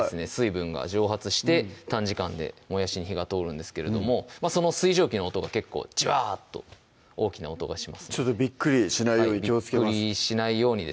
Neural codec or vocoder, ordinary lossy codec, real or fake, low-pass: none; none; real; none